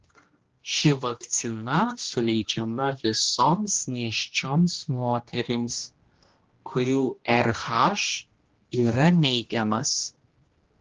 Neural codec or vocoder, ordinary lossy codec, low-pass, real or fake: codec, 16 kHz, 1 kbps, X-Codec, HuBERT features, trained on general audio; Opus, 16 kbps; 7.2 kHz; fake